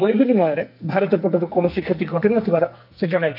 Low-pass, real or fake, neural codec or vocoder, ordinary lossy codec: 5.4 kHz; fake; codec, 44.1 kHz, 2.6 kbps, SNAC; none